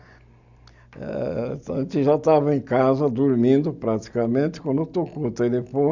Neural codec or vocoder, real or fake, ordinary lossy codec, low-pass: none; real; none; 7.2 kHz